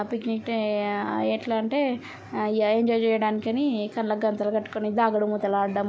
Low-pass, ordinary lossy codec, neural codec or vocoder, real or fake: none; none; none; real